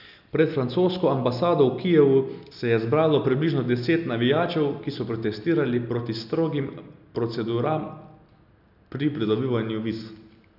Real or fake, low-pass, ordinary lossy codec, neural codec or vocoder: real; 5.4 kHz; none; none